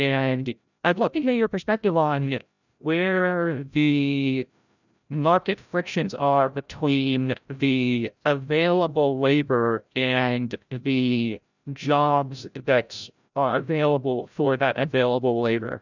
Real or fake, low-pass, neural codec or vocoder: fake; 7.2 kHz; codec, 16 kHz, 0.5 kbps, FreqCodec, larger model